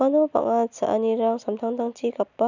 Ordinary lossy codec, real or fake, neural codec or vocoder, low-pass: none; real; none; 7.2 kHz